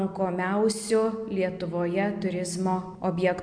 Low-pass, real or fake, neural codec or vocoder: 9.9 kHz; real; none